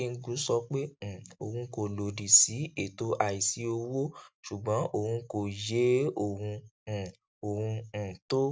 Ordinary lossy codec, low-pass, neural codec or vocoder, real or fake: none; none; none; real